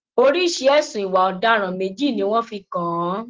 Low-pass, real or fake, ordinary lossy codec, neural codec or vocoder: 7.2 kHz; real; Opus, 16 kbps; none